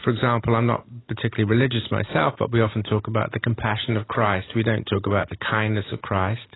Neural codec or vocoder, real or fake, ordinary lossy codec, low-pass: none; real; AAC, 16 kbps; 7.2 kHz